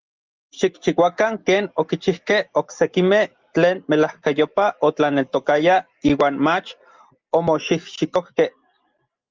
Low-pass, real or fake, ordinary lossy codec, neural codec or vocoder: 7.2 kHz; real; Opus, 32 kbps; none